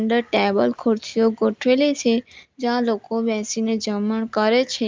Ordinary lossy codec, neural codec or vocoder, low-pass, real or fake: Opus, 32 kbps; none; 7.2 kHz; real